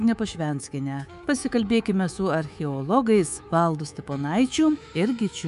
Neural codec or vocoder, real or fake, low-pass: codec, 24 kHz, 3.1 kbps, DualCodec; fake; 10.8 kHz